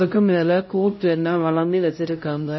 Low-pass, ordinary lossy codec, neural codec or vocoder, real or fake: 7.2 kHz; MP3, 24 kbps; codec, 16 kHz, 0.5 kbps, X-Codec, HuBERT features, trained on LibriSpeech; fake